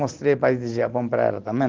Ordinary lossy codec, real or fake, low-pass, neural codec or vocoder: Opus, 16 kbps; real; 7.2 kHz; none